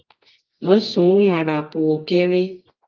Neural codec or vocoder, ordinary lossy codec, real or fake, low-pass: codec, 24 kHz, 0.9 kbps, WavTokenizer, medium music audio release; Opus, 24 kbps; fake; 7.2 kHz